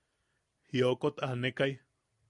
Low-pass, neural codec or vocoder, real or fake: 10.8 kHz; none; real